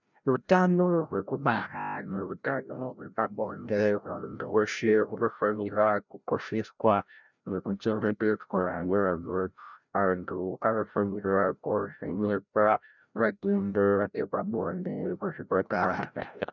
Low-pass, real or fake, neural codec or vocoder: 7.2 kHz; fake; codec, 16 kHz, 0.5 kbps, FreqCodec, larger model